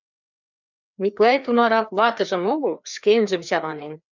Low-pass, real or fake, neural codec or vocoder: 7.2 kHz; fake; codec, 16 kHz, 2 kbps, FreqCodec, larger model